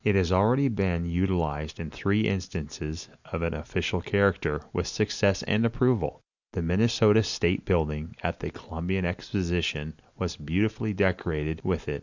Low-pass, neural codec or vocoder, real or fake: 7.2 kHz; none; real